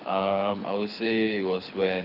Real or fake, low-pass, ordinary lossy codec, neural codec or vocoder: fake; 5.4 kHz; none; codec, 24 kHz, 6 kbps, HILCodec